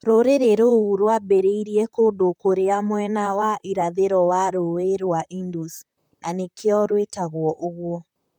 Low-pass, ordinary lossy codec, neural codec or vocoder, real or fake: 19.8 kHz; MP3, 96 kbps; vocoder, 44.1 kHz, 128 mel bands, Pupu-Vocoder; fake